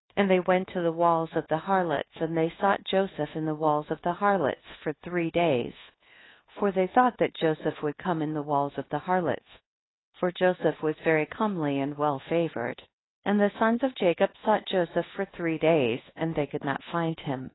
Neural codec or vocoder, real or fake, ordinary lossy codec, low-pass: codec, 24 kHz, 1.2 kbps, DualCodec; fake; AAC, 16 kbps; 7.2 kHz